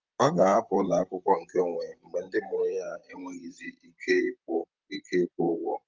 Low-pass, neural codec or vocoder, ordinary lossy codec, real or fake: 7.2 kHz; vocoder, 44.1 kHz, 128 mel bands, Pupu-Vocoder; Opus, 24 kbps; fake